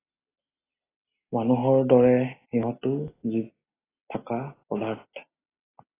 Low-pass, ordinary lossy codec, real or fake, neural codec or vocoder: 3.6 kHz; AAC, 16 kbps; real; none